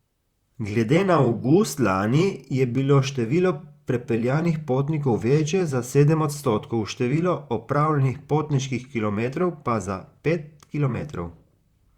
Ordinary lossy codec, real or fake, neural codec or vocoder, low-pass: Opus, 64 kbps; fake; vocoder, 44.1 kHz, 128 mel bands, Pupu-Vocoder; 19.8 kHz